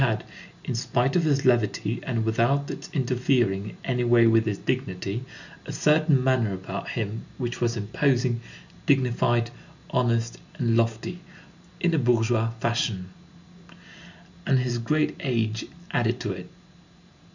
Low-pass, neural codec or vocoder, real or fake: 7.2 kHz; none; real